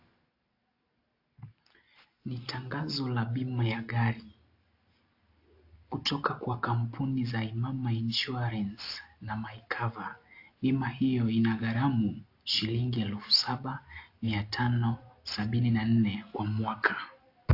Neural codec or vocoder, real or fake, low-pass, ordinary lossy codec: none; real; 5.4 kHz; AAC, 32 kbps